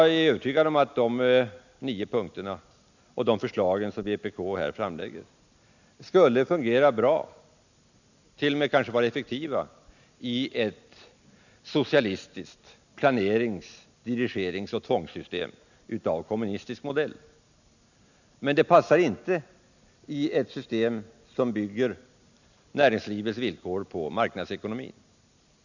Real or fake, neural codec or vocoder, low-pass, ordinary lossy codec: real; none; 7.2 kHz; none